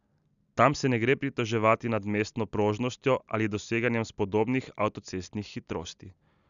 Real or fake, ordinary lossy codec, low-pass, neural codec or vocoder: real; none; 7.2 kHz; none